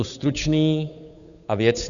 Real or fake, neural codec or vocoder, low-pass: fake; codec, 16 kHz, 8 kbps, FunCodec, trained on Chinese and English, 25 frames a second; 7.2 kHz